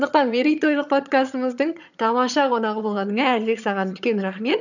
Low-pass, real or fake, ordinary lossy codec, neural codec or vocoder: 7.2 kHz; fake; none; vocoder, 22.05 kHz, 80 mel bands, HiFi-GAN